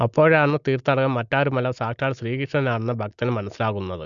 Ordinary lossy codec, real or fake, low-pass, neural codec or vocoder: none; real; 7.2 kHz; none